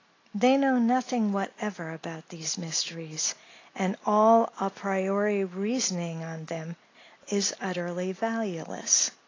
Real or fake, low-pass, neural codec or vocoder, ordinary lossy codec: real; 7.2 kHz; none; AAC, 32 kbps